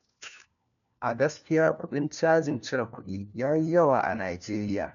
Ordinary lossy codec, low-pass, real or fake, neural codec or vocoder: none; 7.2 kHz; fake; codec, 16 kHz, 1 kbps, FunCodec, trained on LibriTTS, 50 frames a second